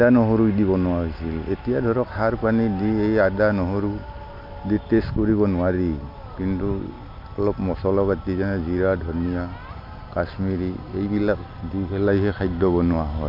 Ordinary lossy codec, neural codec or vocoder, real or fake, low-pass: MP3, 48 kbps; none; real; 5.4 kHz